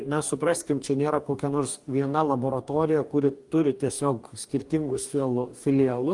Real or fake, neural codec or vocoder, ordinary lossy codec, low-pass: fake; codec, 44.1 kHz, 2.6 kbps, DAC; Opus, 32 kbps; 10.8 kHz